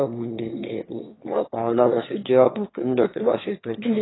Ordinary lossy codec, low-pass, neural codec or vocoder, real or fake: AAC, 16 kbps; 7.2 kHz; autoencoder, 22.05 kHz, a latent of 192 numbers a frame, VITS, trained on one speaker; fake